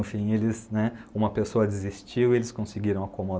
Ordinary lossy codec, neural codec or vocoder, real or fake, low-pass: none; none; real; none